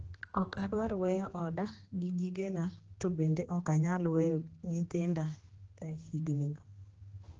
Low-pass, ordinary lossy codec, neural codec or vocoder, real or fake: 7.2 kHz; Opus, 16 kbps; codec, 16 kHz, 2 kbps, X-Codec, HuBERT features, trained on general audio; fake